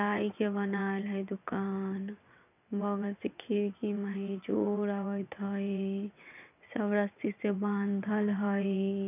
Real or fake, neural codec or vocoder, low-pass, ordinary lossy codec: fake; vocoder, 22.05 kHz, 80 mel bands, WaveNeXt; 3.6 kHz; none